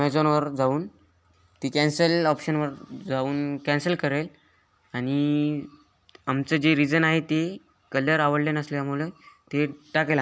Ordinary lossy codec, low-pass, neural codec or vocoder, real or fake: none; none; none; real